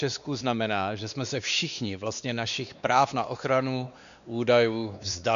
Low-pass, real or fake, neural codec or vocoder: 7.2 kHz; fake; codec, 16 kHz, 2 kbps, X-Codec, WavLM features, trained on Multilingual LibriSpeech